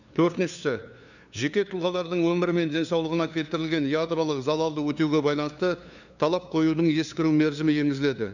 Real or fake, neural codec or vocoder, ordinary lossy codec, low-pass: fake; codec, 16 kHz, 2 kbps, FunCodec, trained on LibriTTS, 25 frames a second; none; 7.2 kHz